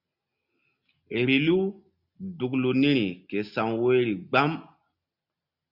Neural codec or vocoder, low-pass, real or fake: none; 5.4 kHz; real